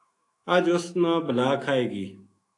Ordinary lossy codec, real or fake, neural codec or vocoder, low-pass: AAC, 48 kbps; fake; autoencoder, 48 kHz, 128 numbers a frame, DAC-VAE, trained on Japanese speech; 10.8 kHz